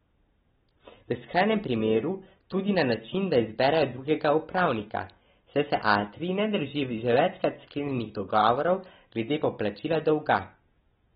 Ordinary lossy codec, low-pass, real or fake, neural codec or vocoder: AAC, 16 kbps; 7.2 kHz; real; none